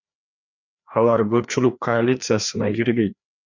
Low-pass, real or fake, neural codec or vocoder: 7.2 kHz; fake; codec, 16 kHz, 2 kbps, FreqCodec, larger model